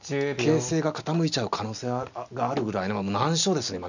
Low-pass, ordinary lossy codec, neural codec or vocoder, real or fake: 7.2 kHz; none; none; real